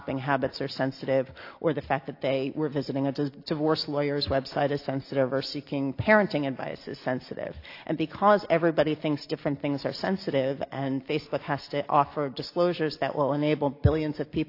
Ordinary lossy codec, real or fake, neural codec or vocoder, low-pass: AAC, 32 kbps; real; none; 5.4 kHz